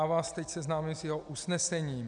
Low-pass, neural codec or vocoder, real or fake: 9.9 kHz; none; real